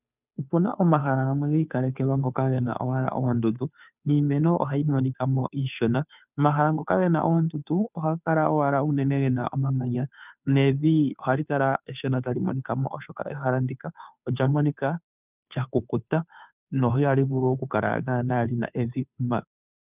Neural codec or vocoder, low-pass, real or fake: codec, 16 kHz, 2 kbps, FunCodec, trained on Chinese and English, 25 frames a second; 3.6 kHz; fake